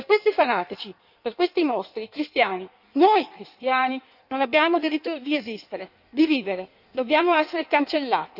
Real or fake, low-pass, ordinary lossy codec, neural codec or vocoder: fake; 5.4 kHz; none; codec, 16 kHz in and 24 kHz out, 1.1 kbps, FireRedTTS-2 codec